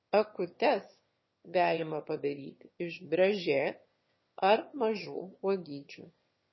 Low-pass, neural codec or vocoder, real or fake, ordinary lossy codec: 7.2 kHz; autoencoder, 22.05 kHz, a latent of 192 numbers a frame, VITS, trained on one speaker; fake; MP3, 24 kbps